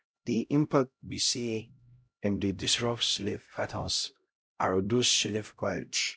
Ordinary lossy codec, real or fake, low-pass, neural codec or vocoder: none; fake; none; codec, 16 kHz, 0.5 kbps, X-Codec, HuBERT features, trained on LibriSpeech